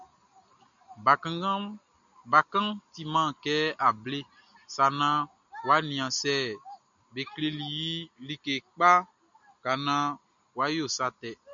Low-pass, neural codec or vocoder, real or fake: 7.2 kHz; none; real